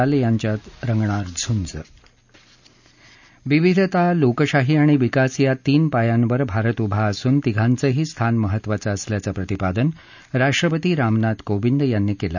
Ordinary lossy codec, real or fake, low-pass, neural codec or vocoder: none; real; 7.2 kHz; none